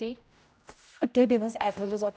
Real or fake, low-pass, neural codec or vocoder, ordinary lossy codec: fake; none; codec, 16 kHz, 0.5 kbps, X-Codec, HuBERT features, trained on general audio; none